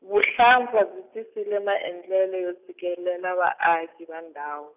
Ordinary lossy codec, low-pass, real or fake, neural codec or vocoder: none; 3.6 kHz; real; none